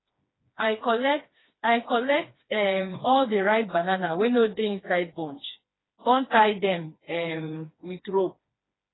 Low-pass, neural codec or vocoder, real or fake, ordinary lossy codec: 7.2 kHz; codec, 16 kHz, 2 kbps, FreqCodec, smaller model; fake; AAC, 16 kbps